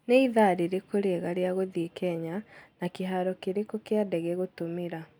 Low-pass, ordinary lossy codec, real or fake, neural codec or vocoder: none; none; real; none